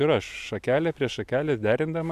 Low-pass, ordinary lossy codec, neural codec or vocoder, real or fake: 14.4 kHz; AAC, 96 kbps; none; real